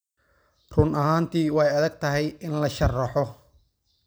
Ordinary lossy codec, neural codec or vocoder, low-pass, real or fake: none; none; none; real